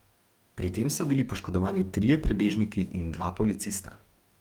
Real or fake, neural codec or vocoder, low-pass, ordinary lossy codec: fake; codec, 44.1 kHz, 2.6 kbps, DAC; 19.8 kHz; Opus, 32 kbps